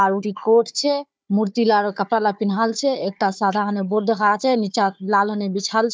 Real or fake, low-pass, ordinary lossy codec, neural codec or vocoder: fake; none; none; codec, 16 kHz, 4 kbps, FunCodec, trained on Chinese and English, 50 frames a second